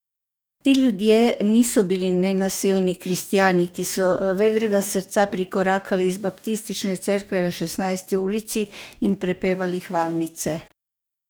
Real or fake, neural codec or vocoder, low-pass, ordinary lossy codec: fake; codec, 44.1 kHz, 2.6 kbps, DAC; none; none